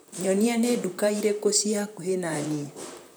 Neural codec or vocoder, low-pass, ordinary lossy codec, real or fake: vocoder, 44.1 kHz, 128 mel bands, Pupu-Vocoder; none; none; fake